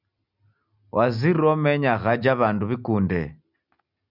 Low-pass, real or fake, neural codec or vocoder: 5.4 kHz; real; none